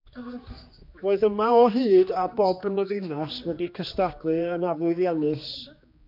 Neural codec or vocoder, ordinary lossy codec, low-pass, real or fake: codec, 16 kHz, 2 kbps, X-Codec, HuBERT features, trained on general audio; MP3, 48 kbps; 5.4 kHz; fake